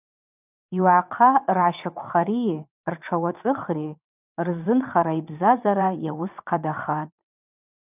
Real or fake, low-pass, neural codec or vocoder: fake; 3.6 kHz; vocoder, 22.05 kHz, 80 mel bands, WaveNeXt